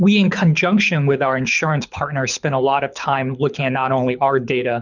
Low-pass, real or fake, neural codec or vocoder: 7.2 kHz; fake; codec, 24 kHz, 6 kbps, HILCodec